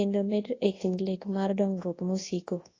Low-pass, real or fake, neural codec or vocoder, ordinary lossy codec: 7.2 kHz; fake; codec, 24 kHz, 0.9 kbps, WavTokenizer, large speech release; AAC, 32 kbps